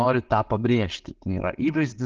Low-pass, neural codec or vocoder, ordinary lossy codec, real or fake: 7.2 kHz; none; Opus, 16 kbps; real